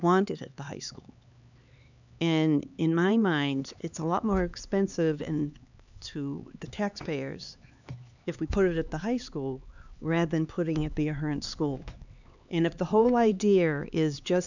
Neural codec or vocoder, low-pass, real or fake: codec, 16 kHz, 4 kbps, X-Codec, HuBERT features, trained on LibriSpeech; 7.2 kHz; fake